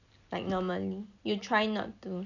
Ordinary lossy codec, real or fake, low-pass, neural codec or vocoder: none; real; 7.2 kHz; none